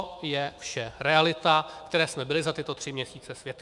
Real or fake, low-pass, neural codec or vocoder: fake; 10.8 kHz; autoencoder, 48 kHz, 128 numbers a frame, DAC-VAE, trained on Japanese speech